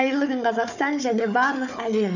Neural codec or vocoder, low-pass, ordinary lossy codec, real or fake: codec, 16 kHz, 4 kbps, FunCodec, trained on Chinese and English, 50 frames a second; 7.2 kHz; none; fake